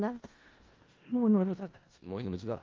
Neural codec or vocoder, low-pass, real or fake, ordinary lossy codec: codec, 16 kHz in and 24 kHz out, 0.4 kbps, LongCat-Audio-Codec, four codebook decoder; 7.2 kHz; fake; Opus, 32 kbps